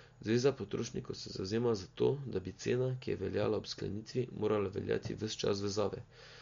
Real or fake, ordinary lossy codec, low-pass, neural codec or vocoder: real; MP3, 48 kbps; 7.2 kHz; none